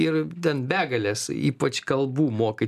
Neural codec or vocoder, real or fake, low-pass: none; real; 14.4 kHz